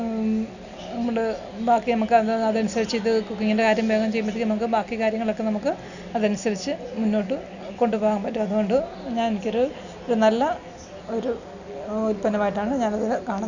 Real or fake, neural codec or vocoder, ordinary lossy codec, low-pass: real; none; none; 7.2 kHz